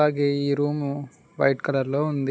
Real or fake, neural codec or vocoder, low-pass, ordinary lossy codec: real; none; none; none